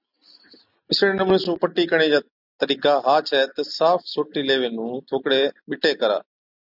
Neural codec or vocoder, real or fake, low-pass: none; real; 5.4 kHz